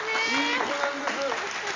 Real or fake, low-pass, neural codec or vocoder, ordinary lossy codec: real; 7.2 kHz; none; MP3, 48 kbps